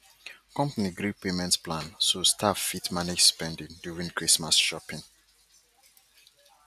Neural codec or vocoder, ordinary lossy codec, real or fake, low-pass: none; none; real; 14.4 kHz